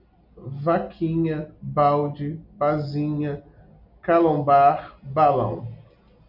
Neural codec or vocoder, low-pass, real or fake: none; 5.4 kHz; real